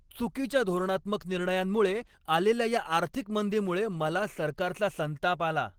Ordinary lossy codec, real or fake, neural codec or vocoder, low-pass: Opus, 16 kbps; real; none; 19.8 kHz